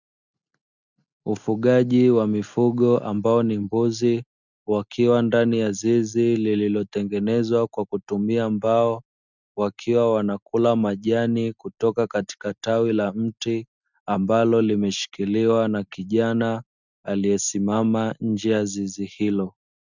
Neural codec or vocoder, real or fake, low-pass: none; real; 7.2 kHz